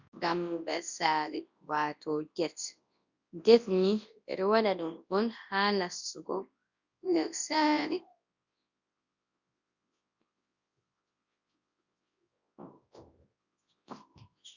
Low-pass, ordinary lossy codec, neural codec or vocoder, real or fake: 7.2 kHz; Opus, 64 kbps; codec, 24 kHz, 0.9 kbps, WavTokenizer, large speech release; fake